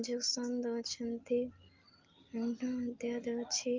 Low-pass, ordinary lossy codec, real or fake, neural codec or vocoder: 7.2 kHz; Opus, 32 kbps; real; none